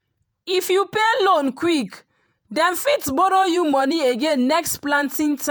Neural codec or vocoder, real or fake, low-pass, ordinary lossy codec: vocoder, 48 kHz, 128 mel bands, Vocos; fake; none; none